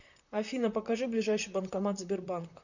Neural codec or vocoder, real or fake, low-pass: vocoder, 44.1 kHz, 128 mel bands, Pupu-Vocoder; fake; 7.2 kHz